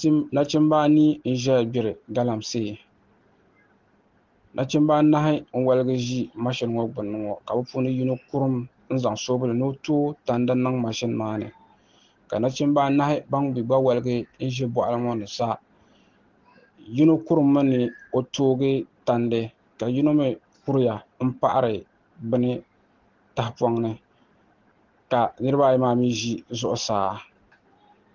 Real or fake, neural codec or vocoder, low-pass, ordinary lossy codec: real; none; 7.2 kHz; Opus, 16 kbps